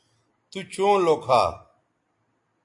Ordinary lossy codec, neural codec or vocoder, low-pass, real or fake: AAC, 64 kbps; none; 10.8 kHz; real